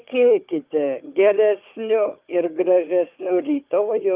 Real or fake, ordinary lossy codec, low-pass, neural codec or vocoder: fake; Opus, 32 kbps; 3.6 kHz; codec, 16 kHz, 4 kbps, FunCodec, trained on Chinese and English, 50 frames a second